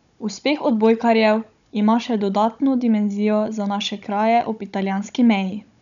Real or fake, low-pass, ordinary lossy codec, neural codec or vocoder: fake; 7.2 kHz; none; codec, 16 kHz, 16 kbps, FunCodec, trained on Chinese and English, 50 frames a second